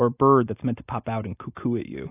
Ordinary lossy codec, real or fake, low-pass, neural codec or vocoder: AAC, 24 kbps; real; 3.6 kHz; none